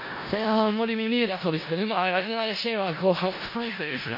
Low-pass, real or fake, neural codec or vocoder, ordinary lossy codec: 5.4 kHz; fake; codec, 16 kHz in and 24 kHz out, 0.4 kbps, LongCat-Audio-Codec, four codebook decoder; MP3, 32 kbps